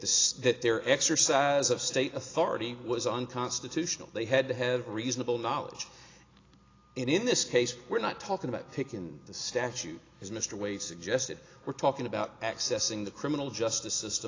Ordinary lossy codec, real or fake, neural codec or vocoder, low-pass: AAC, 32 kbps; real; none; 7.2 kHz